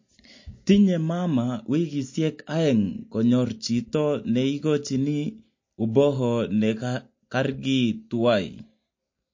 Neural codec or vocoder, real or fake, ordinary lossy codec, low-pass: none; real; MP3, 32 kbps; 7.2 kHz